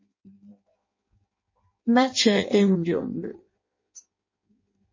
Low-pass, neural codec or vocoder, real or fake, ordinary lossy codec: 7.2 kHz; codec, 16 kHz in and 24 kHz out, 0.6 kbps, FireRedTTS-2 codec; fake; MP3, 32 kbps